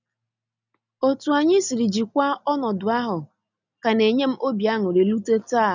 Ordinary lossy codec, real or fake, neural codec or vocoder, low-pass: none; real; none; 7.2 kHz